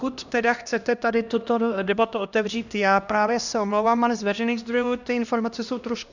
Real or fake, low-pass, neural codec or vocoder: fake; 7.2 kHz; codec, 16 kHz, 1 kbps, X-Codec, HuBERT features, trained on LibriSpeech